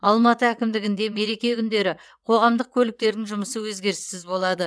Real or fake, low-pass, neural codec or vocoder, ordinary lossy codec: fake; none; vocoder, 22.05 kHz, 80 mel bands, WaveNeXt; none